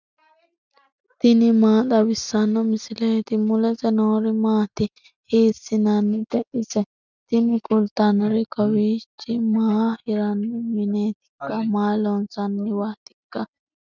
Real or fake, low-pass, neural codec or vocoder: real; 7.2 kHz; none